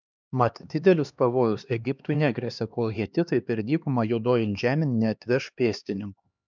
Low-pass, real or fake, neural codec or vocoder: 7.2 kHz; fake; codec, 16 kHz, 2 kbps, X-Codec, HuBERT features, trained on LibriSpeech